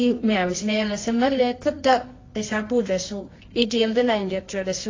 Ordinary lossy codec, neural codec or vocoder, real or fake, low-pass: AAC, 32 kbps; codec, 24 kHz, 0.9 kbps, WavTokenizer, medium music audio release; fake; 7.2 kHz